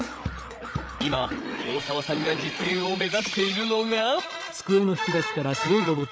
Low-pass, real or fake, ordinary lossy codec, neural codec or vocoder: none; fake; none; codec, 16 kHz, 8 kbps, FreqCodec, larger model